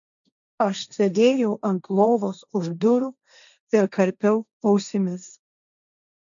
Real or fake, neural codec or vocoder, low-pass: fake; codec, 16 kHz, 1.1 kbps, Voila-Tokenizer; 7.2 kHz